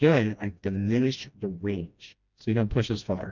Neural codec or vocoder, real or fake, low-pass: codec, 16 kHz, 1 kbps, FreqCodec, smaller model; fake; 7.2 kHz